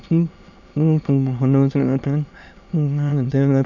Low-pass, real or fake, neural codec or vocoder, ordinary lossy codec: 7.2 kHz; fake; autoencoder, 22.05 kHz, a latent of 192 numbers a frame, VITS, trained on many speakers; none